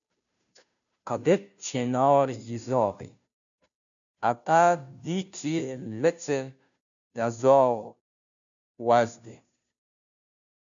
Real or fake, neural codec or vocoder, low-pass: fake; codec, 16 kHz, 0.5 kbps, FunCodec, trained on Chinese and English, 25 frames a second; 7.2 kHz